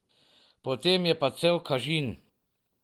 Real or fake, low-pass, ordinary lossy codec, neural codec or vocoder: real; 19.8 kHz; Opus, 24 kbps; none